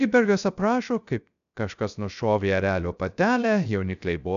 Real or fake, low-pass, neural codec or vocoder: fake; 7.2 kHz; codec, 16 kHz, 0.3 kbps, FocalCodec